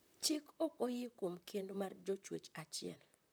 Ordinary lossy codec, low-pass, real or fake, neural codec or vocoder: none; none; fake; vocoder, 44.1 kHz, 128 mel bands, Pupu-Vocoder